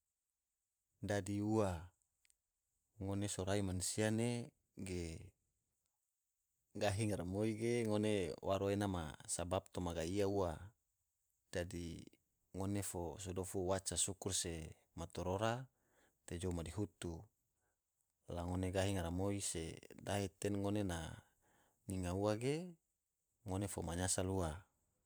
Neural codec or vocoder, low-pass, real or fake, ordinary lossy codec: vocoder, 44.1 kHz, 128 mel bands every 512 samples, BigVGAN v2; none; fake; none